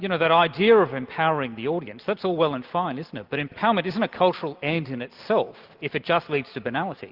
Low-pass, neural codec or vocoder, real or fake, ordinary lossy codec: 5.4 kHz; none; real; Opus, 32 kbps